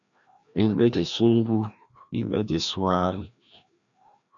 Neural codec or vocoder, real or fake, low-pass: codec, 16 kHz, 1 kbps, FreqCodec, larger model; fake; 7.2 kHz